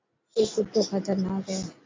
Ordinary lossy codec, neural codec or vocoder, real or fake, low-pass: MP3, 64 kbps; none; real; 7.2 kHz